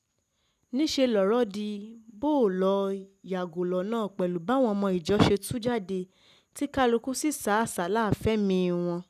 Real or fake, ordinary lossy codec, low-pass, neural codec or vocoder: real; none; 14.4 kHz; none